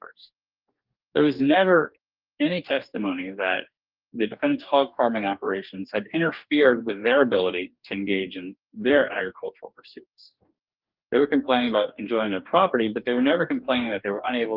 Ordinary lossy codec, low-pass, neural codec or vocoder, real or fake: Opus, 32 kbps; 5.4 kHz; codec, 44.1 kHz, 2.6 kbps, DAC; fake